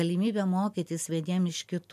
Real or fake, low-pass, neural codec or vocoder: real; 14.4 kHz; none